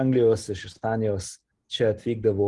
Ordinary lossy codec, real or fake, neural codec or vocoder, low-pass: Opus, 24 kbps; real; none; 10.8 kHz